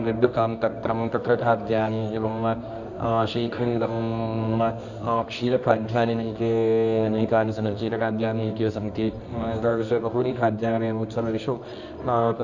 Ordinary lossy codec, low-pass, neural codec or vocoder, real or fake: none; 7.2 kHz; codec, 24 kHz, 0.9 kbps, WavTokenizer, medium music audio release; fake